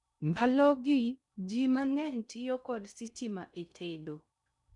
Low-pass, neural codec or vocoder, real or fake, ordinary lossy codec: 10.8 kHz; codec, 16 kHz in and 24 kHz out, 0.6 kbps, FocalCodec, streaming, 2048 codes; fake; none